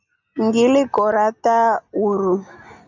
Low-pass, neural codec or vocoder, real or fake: 7.2 kHz; none; real